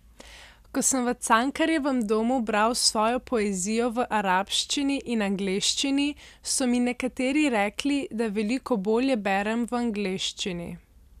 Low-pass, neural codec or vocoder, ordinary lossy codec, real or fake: 14.4 kHz; none; none; real